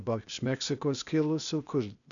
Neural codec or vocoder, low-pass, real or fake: codec, 16 kHz, 0.8 kbps, ZipCodec; 7.2 kHz; fake